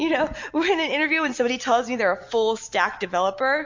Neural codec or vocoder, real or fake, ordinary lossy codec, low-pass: none; real; MP3, 48 kbps; 7.2 kHz